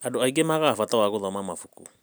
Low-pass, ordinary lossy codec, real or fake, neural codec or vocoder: none; none; real; none